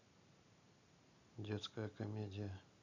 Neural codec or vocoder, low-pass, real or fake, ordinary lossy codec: none; 7.2 kHz; real; none